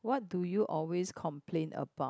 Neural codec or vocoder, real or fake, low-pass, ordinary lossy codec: none; real; none; none